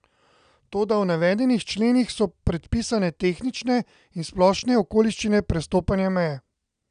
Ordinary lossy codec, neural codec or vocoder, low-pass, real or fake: none; none; 9.9 kHz; real